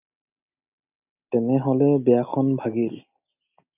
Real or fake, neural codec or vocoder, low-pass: real; none; 3.6 kHz